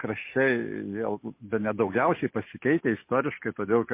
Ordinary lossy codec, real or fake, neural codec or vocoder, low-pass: MP3, 24 kbps; real; none; 3.6 kHz